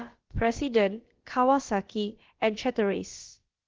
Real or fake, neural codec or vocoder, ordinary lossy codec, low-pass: fake; codec, 16 kHz, about 1 kbps, DyCAST, with the encoder's durations; Opus, 16 kbps; 7.2 kHz